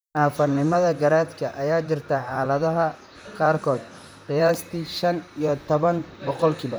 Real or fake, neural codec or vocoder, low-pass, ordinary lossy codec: fake; vocoder, 44.1 kHz, 128 mel bands, Pupu-Vocoder; none; none